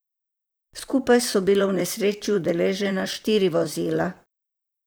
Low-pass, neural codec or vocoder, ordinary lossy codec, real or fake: none; vocoder, 44.1 kHz, 128 mel bands, Pupu-Vocoder; none; fake